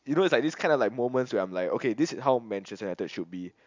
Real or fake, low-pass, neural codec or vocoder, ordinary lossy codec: real; 7.2 kHz; none; MP3, 64 kbps